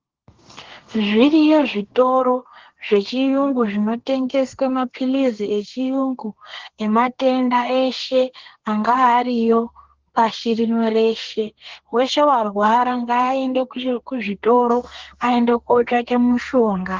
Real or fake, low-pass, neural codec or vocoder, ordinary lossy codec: fake; 7.2 kHz; codec, 32 kHz, 1.9 kbps, SNAC; Opus, 16 kbps